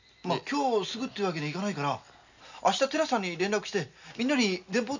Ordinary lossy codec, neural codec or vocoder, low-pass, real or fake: none; none; 7.2 kHz; real